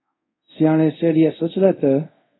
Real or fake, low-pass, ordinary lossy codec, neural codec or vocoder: fake; 7.2 kHz; AAC, 16 kbps; codec, 24 kHz, 0.5 kbps, DualCodec